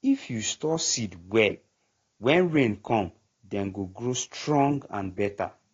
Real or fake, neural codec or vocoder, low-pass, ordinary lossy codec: real; none; 7.2 kHz; AAC, 32 kbps